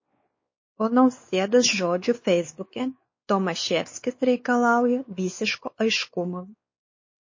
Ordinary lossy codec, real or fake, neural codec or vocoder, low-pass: MP3, 32 kbps; fake; codec, 16 kHz, 4 kbps, X-Codec, WavLM features, trained on Multilingual LibriSpeech; 7.2 kHz